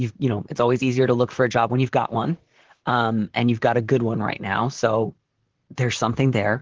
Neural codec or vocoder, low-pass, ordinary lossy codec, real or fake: vocoder, 44.1 kHz, 128 mel bands, Pupu-Vocoder; 7.2 kHz; Opus, 16 kbps; fake